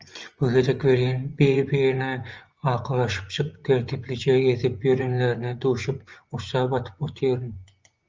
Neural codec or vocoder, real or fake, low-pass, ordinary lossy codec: vocoder, 24 kHz, 100 mel bands, Vocos; fake; 7.2 kHz; Opus, 24 kbps